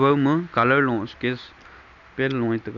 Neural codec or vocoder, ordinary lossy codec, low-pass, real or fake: none; none; 7.2 kHz; real